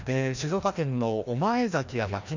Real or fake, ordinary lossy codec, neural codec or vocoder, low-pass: fake; none; codec, 16 kHz, 1 kbps, FreqCodec, larger model; 7.2 kHz